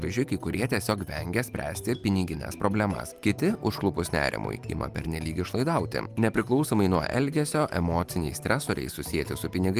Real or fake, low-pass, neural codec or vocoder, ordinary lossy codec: real; 14.4 kHz; none; Opus, 32 kbps